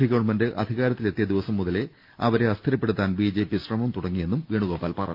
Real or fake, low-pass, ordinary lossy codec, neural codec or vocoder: real; 5.4 kHz; Opus, 24 kbps; none